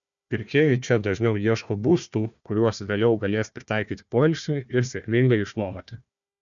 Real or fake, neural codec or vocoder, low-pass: fake; codec, 16 kHz, 1 kbps, FunCodec, trained on Chinese and English, 50 frames a second; 7.2 kHz